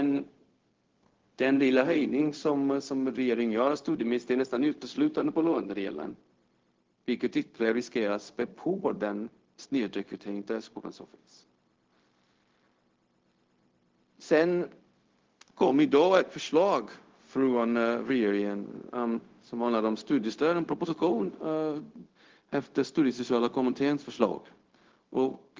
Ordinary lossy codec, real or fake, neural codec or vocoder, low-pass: Opus, 16 kbps; fake; codec, 16 kHz, 0.4 kbps, LongCat-Audio-Codec; 7.2 kHz